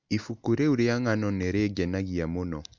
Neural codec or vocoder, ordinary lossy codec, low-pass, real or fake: none; MP3, 64 kbps; 7.2 kHz; real